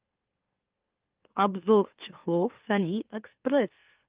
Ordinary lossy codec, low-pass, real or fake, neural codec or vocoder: Opus, 24 kbps; 3.6 kHz; fake; autoencoder, 44.1 kHz, a latent of 192 numbers a frame, MeloTTS